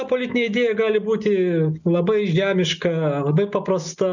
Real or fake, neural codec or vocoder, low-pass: real; none; 7.2 kHz